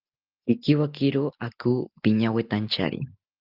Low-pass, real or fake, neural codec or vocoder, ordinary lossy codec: 5.4 kHz; real; none; Opus, 32 kbps